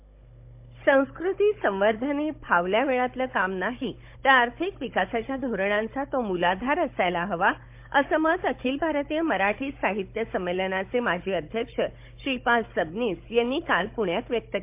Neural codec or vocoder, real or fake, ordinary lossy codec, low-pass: codec, 16 kHz, 16 kbps, FunCodec, trained on Chinese and English, 50 frames a second; fake; MP3, 32 kbps; 3.6 kHz